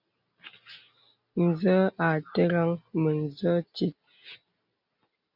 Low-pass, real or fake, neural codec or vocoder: 5.4 kHz; real; none